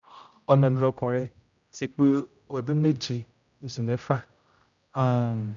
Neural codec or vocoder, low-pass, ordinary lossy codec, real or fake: codec, 16 kHz, 0.5 kbps, X-Codec, HuBERT features, trained on general audio; 7.2 kHz; none; fake